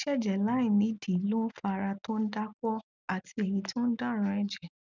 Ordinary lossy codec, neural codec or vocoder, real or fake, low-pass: none; none; real; 7.2 kHz